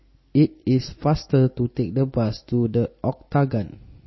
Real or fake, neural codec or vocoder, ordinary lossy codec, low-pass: real; none; MP3, 24 kbps; 7.2 kHz